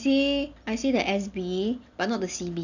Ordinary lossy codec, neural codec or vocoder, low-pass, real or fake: none; none; 7.2 kHz; real